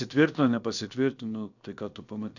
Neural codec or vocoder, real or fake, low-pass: codec, 16 kHz, about 1 kbps, DyCAST, with the encoder's durations; fake; 7.2 kHz